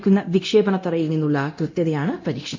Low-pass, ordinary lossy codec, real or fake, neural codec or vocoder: 7.2 kHz; MP3, 64 kbps; fake; codec, 24 kHz, 0.9 kbps, DualCodec